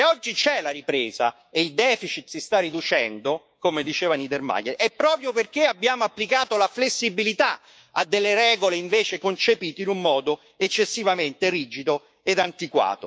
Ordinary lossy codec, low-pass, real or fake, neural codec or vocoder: none; none; fake; codec, 16 kHz, 6 kbps, DAC